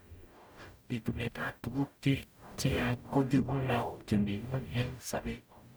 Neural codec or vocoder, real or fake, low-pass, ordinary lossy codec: codec, 44.1 kHz, 0.9 kbps, DAC; fake; none; none